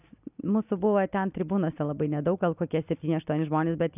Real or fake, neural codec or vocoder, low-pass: real; none; 3.6 kHz